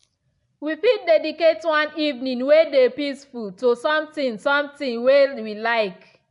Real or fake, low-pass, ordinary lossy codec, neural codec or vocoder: real; 10.8 kHz; none; none